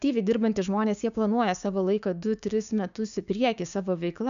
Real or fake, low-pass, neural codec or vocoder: fake; 7.2 kHz; codec, 16 kHz, 6 kbps, DAC